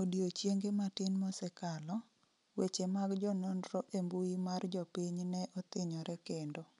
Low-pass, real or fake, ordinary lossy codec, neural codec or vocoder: 10.8 kHz; real; none; none